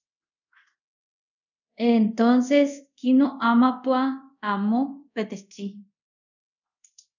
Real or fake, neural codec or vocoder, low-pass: fake; codec, 24 kHz, 0.5 kbps, DualCodec; 7.2 kHz